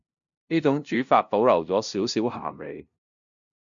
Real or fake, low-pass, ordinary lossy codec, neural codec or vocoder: fake; 7.2 kHz; MP3, 64 kbps; codec, 16 kHz, 0.5 kbps, FunCodec, trained on LibriTTS, 25 frames a second